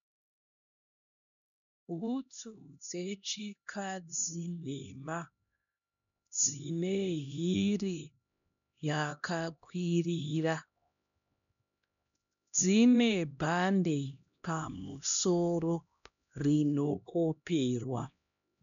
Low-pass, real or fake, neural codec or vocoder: 7.2 kHz; fake; codec, 16 kHz, 1 kbps, X-Codec, HuBERT features, trained on LibriSpeech